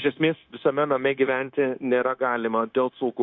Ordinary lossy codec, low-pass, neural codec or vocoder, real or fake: MP3, 48 kbps; 7.2 kHz; codec, 16 kHz, 0.9 kbps, LongCat-Audio-Codec; fake